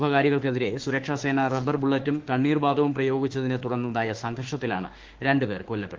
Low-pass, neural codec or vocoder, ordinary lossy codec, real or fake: 7.2 kHz; autoencoder, 48 kHz, 32 numbers a frame, DAC-VAE, trained on Japanese speech; Opus, 16 kbps; fake